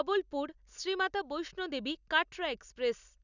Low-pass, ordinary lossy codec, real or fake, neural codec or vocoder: 7.2 kHz; none; real; none